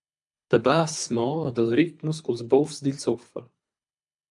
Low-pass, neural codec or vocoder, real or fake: 10.8 kHz; codec, 24 kHz, 3 kbps, HILCodec; fake